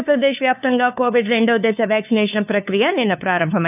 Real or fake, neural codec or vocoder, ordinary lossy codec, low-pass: fake; codec, 16 kHz, 2 kbps, FunCodec, trained on LibriTTS, 25 frames a second; none; 3.6 kHz